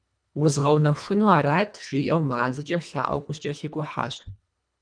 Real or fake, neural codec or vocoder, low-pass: fake; codec, 24 kHz, 1.5 kbps, HILCodec; 9.9 kHz